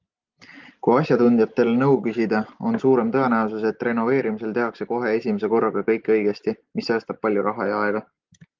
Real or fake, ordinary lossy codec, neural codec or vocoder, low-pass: real; Opus, 32 kbps; none; 7.2 kHz